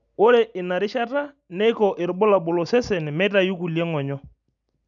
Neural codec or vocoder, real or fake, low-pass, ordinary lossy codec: none; real; 7.2 kHz; none